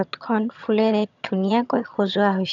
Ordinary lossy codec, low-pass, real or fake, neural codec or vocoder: none; 7.2 kHz; fake; vocoder, 22.05 kHz, 80 mel bands, HiFi-GAN